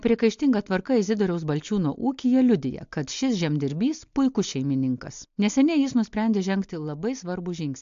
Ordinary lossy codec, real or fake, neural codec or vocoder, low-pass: AAC, 64 kbps; real; none; 7.2 kHz